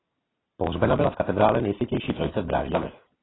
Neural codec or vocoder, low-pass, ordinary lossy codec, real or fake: none; 7.2 kHz; AAC, 16 kbps; real